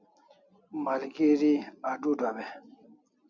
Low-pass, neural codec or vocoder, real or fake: 7.2 kHz; none; real